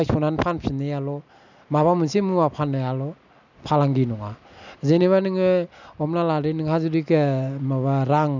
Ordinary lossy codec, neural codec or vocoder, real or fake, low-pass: none; none; real; 7.2 kHz